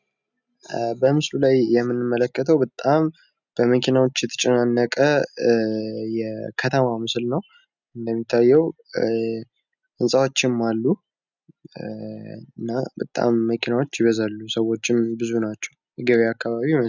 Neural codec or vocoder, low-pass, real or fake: none; 7.2 kHz; real